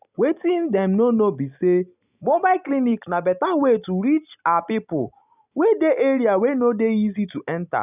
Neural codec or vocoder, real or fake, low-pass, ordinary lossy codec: none; real; 3.6 kHz; none